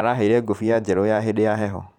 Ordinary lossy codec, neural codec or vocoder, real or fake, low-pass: none; vocoder, 44.1 kHz, 128 mel bands every 512 samples, BigVGAN v2; fake; 19.8 kHz